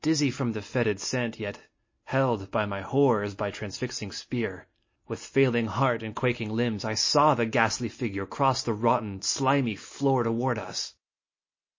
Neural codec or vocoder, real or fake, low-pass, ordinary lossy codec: none; real; 7.2 kHz; MP3, 32 kbps